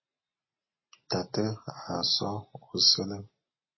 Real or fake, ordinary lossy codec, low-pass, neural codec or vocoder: real; MP3, 24 kbps; 7.2 kHz; none